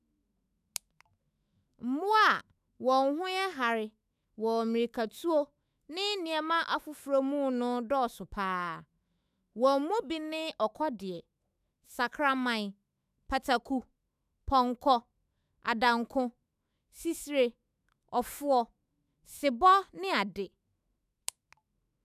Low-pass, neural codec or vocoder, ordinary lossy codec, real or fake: 14.4 kHz; autoencoder, 48 kHz, 128 numbers a frame, DAC-VAE, trained on Japanese speech; none; fake